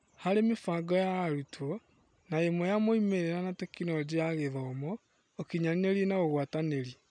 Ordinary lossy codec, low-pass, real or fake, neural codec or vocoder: none; 9.9 kHz; real; none